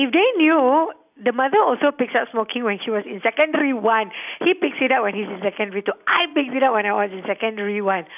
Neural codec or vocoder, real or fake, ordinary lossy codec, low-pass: none; real; none; 3.6 kHz